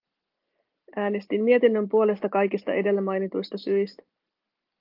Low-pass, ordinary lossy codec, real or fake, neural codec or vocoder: 5.4 kHz; Opus, 24 kbps; real; none